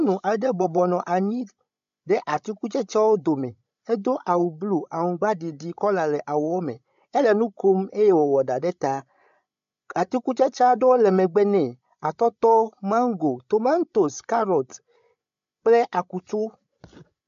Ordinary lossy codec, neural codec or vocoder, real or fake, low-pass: MP3, 64 kbps; codec, 16 kHz, 16 kbps, FreqCodec, larger model; fake; 7.2 kHz